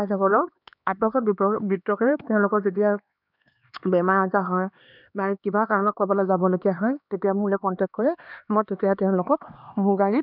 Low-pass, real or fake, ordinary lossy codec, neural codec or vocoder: 5.4 kHz; fake; none; codec, 16 kHz, 2 kbps, X-Codec, HuBERT features, trained on LibriSpeech